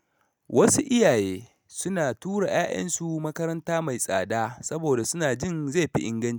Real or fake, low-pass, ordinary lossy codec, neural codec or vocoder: real; none; none; none